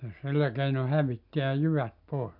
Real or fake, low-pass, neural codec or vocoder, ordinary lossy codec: real; 5.4 kHz; none; MP3, 48 kbps